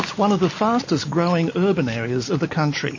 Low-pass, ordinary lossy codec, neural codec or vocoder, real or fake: 7.2 kHz; MP3, 32 kbps; none; real